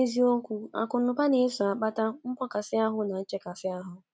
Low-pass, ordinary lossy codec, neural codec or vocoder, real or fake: none; none; none; real